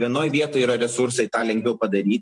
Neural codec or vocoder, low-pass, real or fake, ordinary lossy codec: vocoder, 44.1 kHz, 128 mel bands every 512 samples, BigVGAN v2; 10.8 kHz; fake; MP3, 64 kbps